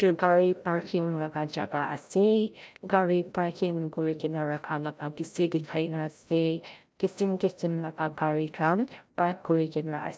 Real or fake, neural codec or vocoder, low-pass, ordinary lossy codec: fake; codec, 16 kHz, 0.5 kbps, FreqCodec, larger model; none; none